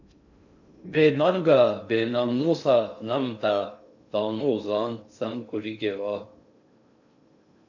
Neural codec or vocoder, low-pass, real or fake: codec, 16 kHz in and 24 kHz out, 0.6 kbps, FocalCodec, streaming, 2048 codes; 7.2 kHz; fake